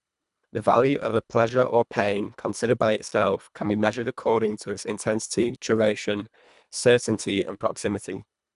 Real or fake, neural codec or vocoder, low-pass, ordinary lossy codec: fake; codec, 24 kHz, 1.5 kbps, HILCodec; 10.8 kHz; none